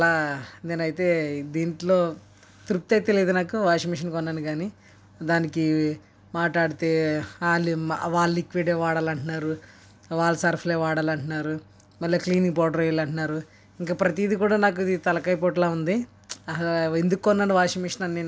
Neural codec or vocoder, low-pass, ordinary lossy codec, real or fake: none; none; none; real